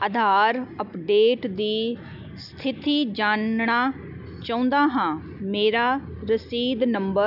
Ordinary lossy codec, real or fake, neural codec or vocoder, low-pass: none; real; none; 5.4 kHz